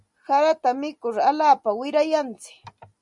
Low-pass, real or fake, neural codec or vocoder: 10.8 kHz; real; none